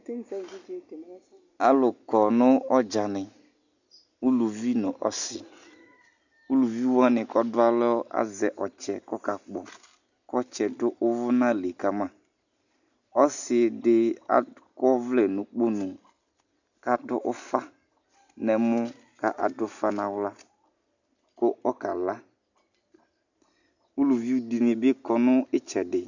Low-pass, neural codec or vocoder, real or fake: 7.2 kHz; none; real